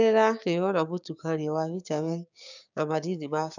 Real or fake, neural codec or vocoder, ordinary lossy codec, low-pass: fake; codec, 16 kHz, 6 kbps, DAC; none; 7.2 kHz